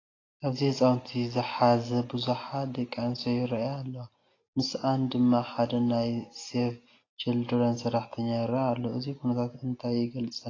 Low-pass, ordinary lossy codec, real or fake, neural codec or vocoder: 7.2 kHz; AAC, 32 kbps; real; none